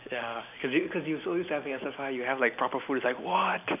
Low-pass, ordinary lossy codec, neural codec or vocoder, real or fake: 3.6 kHz; none; none; real